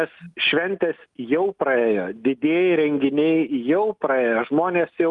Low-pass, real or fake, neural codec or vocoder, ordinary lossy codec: 10.8 kHz; real; none; Opus, 32 kbps